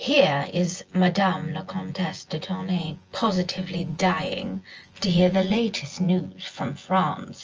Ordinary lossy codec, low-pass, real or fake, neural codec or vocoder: Opus, 24 kbps; 7.2 kHz; fake; vocoder, 24 kHz, 100 mel bands, Vocos